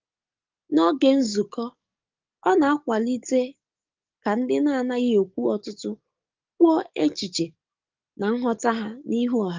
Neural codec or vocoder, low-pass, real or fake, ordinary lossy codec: codec, 44.1 kHz, 7.8 kbps, DAC; 7.2 kHz; fake; Opus, 24 kbps